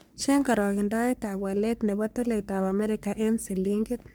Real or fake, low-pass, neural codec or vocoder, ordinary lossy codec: fake; none; codec, 44.1 kHz, 3.4 kbps, Pupu-Codec; none